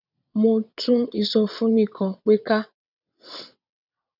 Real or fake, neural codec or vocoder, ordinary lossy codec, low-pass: fake; vocoder, 22.05 kHz, 80 mel bands, WaveNeXt; none; 5.4 kHz